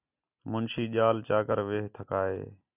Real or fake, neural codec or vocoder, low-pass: real; none; 3.6 kHz